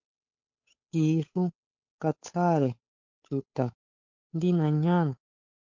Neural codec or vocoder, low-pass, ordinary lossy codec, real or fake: codec, 16 kHz, 8 kbps, FunCodec, trained on Chinese and English, 25 frames a second; 7.2 kHz; MP3, 48 kbps; fake